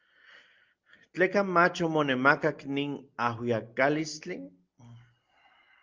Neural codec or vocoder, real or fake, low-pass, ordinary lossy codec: none; real; 7.2 kHz; Opus, 24 kbps